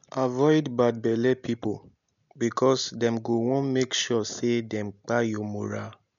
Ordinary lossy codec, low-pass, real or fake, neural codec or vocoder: none; 7.2 kHz; real; none